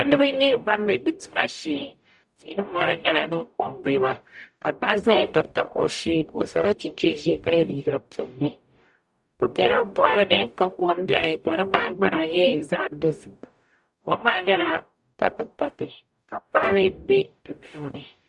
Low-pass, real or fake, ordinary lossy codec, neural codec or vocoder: 10.8 kHz; fake; Opus, 64 kbps; codec, 44.1 kHz, 0.9 kbps, DAC